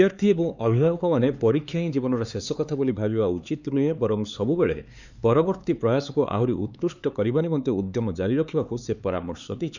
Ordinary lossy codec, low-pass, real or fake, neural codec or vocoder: none; 7.2 kHz; fake; codec, 16 kHz, 4 kbps, X-Codec, HuBERT features, trained on LibriSpeech